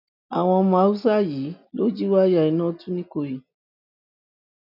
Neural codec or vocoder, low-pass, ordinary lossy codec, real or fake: none; 5.4 kHz; none; real